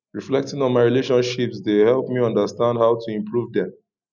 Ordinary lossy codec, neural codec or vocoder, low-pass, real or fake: none; none; 7.2 kHz; real